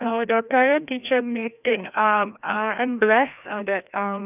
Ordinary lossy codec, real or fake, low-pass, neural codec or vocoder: none; fake; 3.6 kHz; codec, 16 kHz, 1 kbps, FreqCodec, larger model